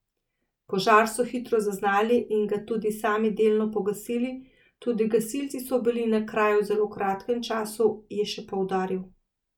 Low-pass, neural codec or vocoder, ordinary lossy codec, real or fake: 19.8 kHz; none; none; real